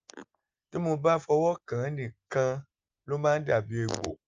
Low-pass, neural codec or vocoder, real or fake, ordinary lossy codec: 7.2 kHz; none; real; Opus, 24 kbps